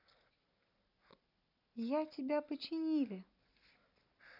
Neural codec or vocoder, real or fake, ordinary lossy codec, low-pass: none; real; none; 5.4 kHz